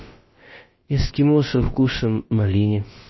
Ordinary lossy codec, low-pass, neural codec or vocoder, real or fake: MP3, 24 kbps; 7.2 kHz; codec, 16 kHz, about 1 kbps, DyCAST, with the encoder's durations; fake